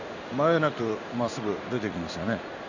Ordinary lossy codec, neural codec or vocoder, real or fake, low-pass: none; codec, 16 kHz in and 24 kHz out, 1 kbps, XY-Tokenizer; fake; 7.2 kHz